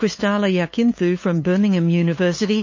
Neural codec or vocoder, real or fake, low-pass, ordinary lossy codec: codec, 16 kHz, 8 kbps, FunCodec, trained on LibriTTS, 25 frames a second; fake; 7.2 kHz; MP3, 32 kbps